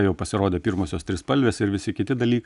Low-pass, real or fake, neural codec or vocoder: 10.8 kHz; real; none